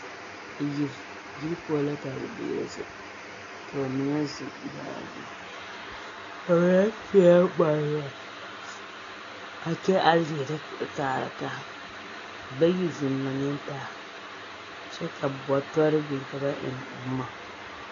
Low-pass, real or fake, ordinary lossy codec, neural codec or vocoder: 7.2 kHz; real; AAC, 32 kbps; none